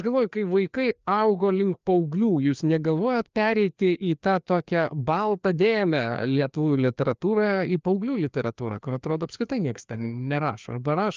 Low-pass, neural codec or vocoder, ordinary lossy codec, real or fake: 7.2 kHz; codec, 16 kHz, 2 kbps, FreqCodec, larger model; Opus, 24 kbps; fake